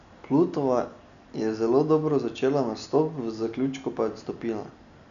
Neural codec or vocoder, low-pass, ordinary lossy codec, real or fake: none; 7.2 kHz; MP3, 96 kbps; real